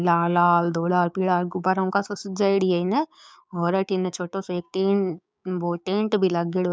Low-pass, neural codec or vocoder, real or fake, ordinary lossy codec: none; codec, 16 kHz, 6 kbps, DAC; fake; none